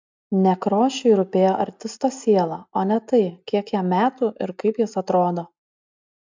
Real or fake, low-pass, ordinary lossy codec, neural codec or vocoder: real; 7.2 kHz; MP3, 64 kbps; none